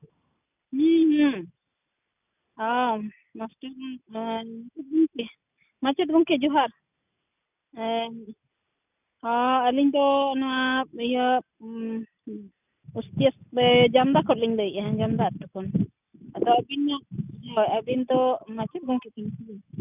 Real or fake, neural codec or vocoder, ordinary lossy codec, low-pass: real; none; none; 3.6 kHz